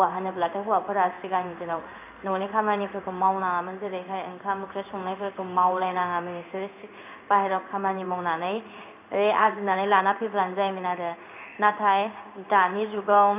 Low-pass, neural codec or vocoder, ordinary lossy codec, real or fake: 3.6 kHz; codec, 16 kHz in and 24 kHz out, 1 kbps, XY-Tokenizer; AAC, 32 kbps; fake